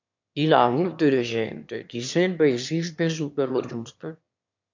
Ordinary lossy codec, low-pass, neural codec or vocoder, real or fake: MP3, 64 kbps; 7.2 kHz; autoencoder, 22.05 kHz, a latent of 192 numbers a frame, VITS, trained on one speaker; fake